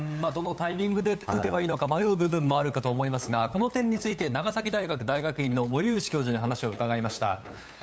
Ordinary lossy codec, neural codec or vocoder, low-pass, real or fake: none; codec, 16 kHz, 8 kbps, FunCodec, trained on LibriTTS, 25 frames a second; none; fake